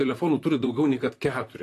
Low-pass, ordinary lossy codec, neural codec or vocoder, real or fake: 14.4 kHz; AAC, 48 kbps; vocoder, 44.1 kHz, 128 mel bands, Pupu-Vocoder; fake